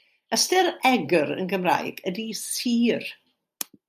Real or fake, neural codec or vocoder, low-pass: real; none; 14.4 kHz